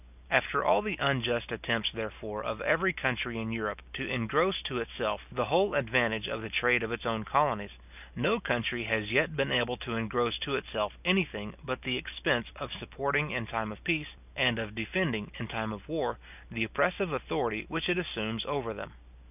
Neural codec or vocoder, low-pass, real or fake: none; 3.6 kHz; real